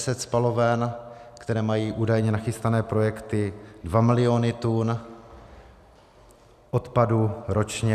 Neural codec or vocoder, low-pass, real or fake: none; 14.4 kHz; real